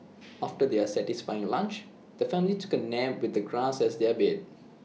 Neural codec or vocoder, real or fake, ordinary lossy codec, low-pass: none; real; none; none